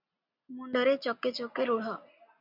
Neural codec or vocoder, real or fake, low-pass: none; real; 5.4 kHz